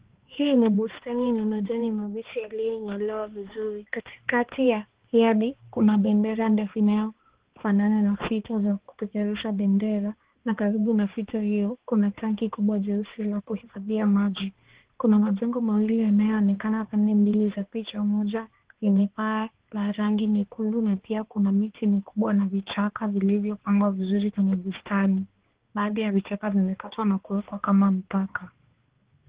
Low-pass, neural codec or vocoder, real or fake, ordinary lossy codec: 3.6 kHz; codec, 16 kHz, 2 kbps, X-Codec, HuBERT features, trained on balanced general audio; fake; Opus, 16 kbps